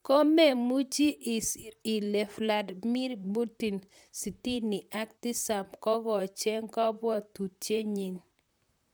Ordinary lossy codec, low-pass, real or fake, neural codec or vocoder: none; none; fake; vocoder, 44.1 kHz, 128 mel bands, Pupu-Vocoder